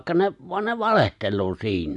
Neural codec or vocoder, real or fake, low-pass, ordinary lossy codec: none; real; 10.8 kHz; none